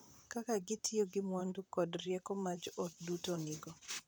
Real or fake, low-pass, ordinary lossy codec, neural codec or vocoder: fake; none; none; vocoder, 44.1 kHz, 128 mel bands, Pupu-Vocoder